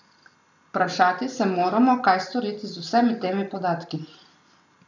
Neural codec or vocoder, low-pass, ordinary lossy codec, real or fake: none; 7.2 kHz; none; real